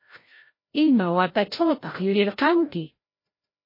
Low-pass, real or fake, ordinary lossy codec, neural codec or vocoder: 5.4 kHz; fake; MP3, 24 kbps; codec, 16 kHz, 0.5 kbps, FreqCodec, larger model